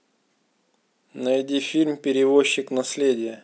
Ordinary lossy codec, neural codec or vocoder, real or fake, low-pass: none; none; real; none